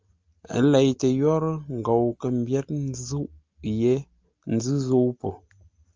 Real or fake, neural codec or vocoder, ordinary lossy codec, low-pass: real; none; Opus, 32 kbps; 7.2 kHz